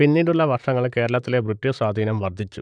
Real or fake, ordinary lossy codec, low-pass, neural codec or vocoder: fake; none; 9.9 kHz; vocoder, 44.1 kHz, 128 mel bands, Pupu-Vocoder